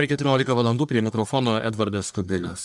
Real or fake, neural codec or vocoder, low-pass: fake; codec, 44.1 kHz, 1.7 kbps, Pupu-Codec; 10.8 kHz